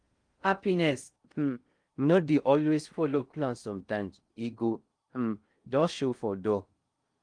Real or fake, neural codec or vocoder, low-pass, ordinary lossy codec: fake; codec, 16 kHz in and 24 kHz out, 0.6 kbps, FocalCodec, streaming, 2048 codes; 9.9 kHz; Opus, 32 kbps